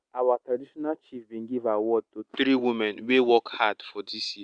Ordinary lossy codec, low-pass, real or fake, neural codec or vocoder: Opus, 64 kbps; 9.9 kHz; real; none